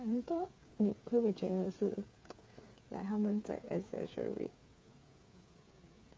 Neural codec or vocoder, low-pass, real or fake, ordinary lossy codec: codec, 16 kHz, 8 kbps, FreqCodec, smaller model; none; fake; none